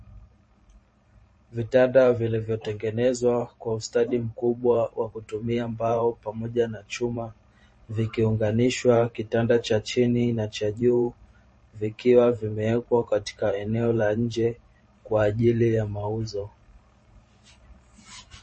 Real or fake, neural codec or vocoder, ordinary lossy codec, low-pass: fake; vocoder, 44.1 kHz, 128 mel bands every 512 samples, BigVGAN v2; MP3, 32 kbps; 10.8 kHz